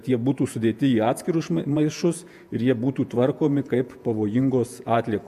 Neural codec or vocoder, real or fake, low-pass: vocoder, 48 kHz, 128 mel bands, Vocos; fake; 14.4 kHz